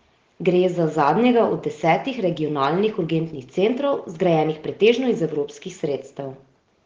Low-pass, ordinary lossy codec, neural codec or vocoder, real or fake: 7.2 kHz; Opus, 16 kbps; none; real